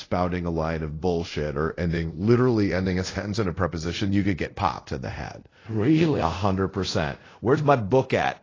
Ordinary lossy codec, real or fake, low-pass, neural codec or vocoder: AAC, 32 kbps; fake; 7.2 kHz; codec, 24 kHz, 0.5 kbps, DualCodec